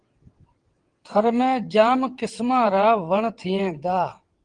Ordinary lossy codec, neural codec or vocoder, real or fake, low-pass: Opus, 24 kbps; vocoder, 22.05 kHz, 80 mel bands, WaveNeXt; fake; 9.9 kHz